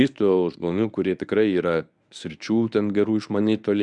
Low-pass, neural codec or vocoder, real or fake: 10.8 kHz; codec, 24 kHz, 0.9 kbps, WavTokenizer, medium speech release version 1; fake